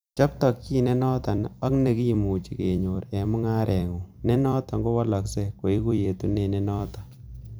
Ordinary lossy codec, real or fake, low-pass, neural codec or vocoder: none; fake; none; vocoder, 44.1 kHz, 128 mel bands every 256 samples, BigVGAN v2